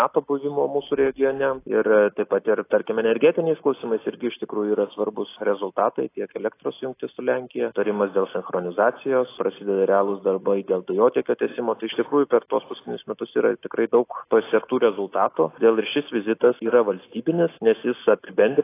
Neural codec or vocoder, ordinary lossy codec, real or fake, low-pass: none; AAC, 24 kbps; real; 3.6 kHz